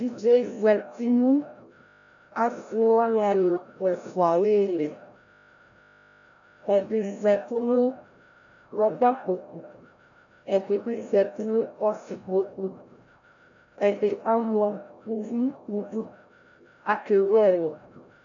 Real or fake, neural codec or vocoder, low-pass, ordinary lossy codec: fake; codec, 16 kHz, 0.5 kbps, FreqCodec, larger model; 7.2 kHz; MP3, 96 kbps